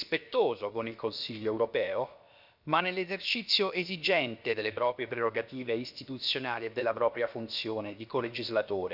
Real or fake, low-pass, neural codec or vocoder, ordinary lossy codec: fake; 5.4 kHz; codec, 16 kHz, about 1 kbps, DyCAST, with the encoder's durations; none